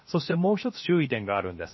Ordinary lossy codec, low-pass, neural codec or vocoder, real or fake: MP3, 24 kbps; 7.2 kHz; codec, 16 kHz, 0.7 kbps, FocalCodec; fake